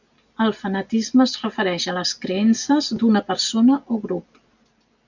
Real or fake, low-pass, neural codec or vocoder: real; 7.2 kHz; none